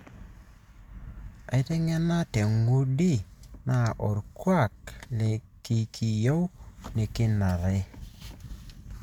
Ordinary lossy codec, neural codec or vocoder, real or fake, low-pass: MP3, 96 kbps; none; real; 19.8 kHz